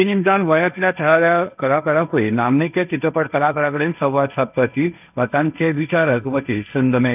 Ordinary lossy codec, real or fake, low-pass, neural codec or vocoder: none; fake; 3.6 kHz; codec, 16 kHz, 1.1 kbps, Voila-Tokenizer